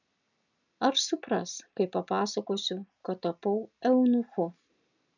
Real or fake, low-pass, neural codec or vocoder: real; 7.2 kHz; none